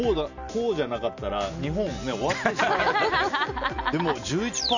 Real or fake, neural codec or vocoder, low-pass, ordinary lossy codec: real; none; 7.2 kHz; none